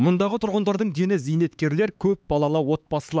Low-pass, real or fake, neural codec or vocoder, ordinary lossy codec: none; fake; codec, 16 kHz, 4 kbps, X-Codec, HuBERT features, trained on LibriSpeech; none